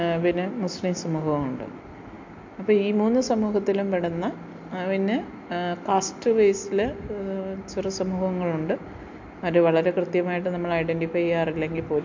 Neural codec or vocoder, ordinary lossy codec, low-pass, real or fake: none; MP3, 48 kbps; 7.2 kHz; real